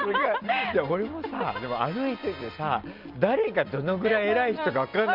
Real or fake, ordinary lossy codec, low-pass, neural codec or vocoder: real; Opus, 24 kbps; 5.4 kHz; none